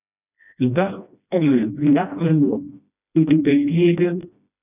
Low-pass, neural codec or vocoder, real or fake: 3.6 kHz; codec, 16 kHz, 1 kbps, FreqCodec, smaller model; fake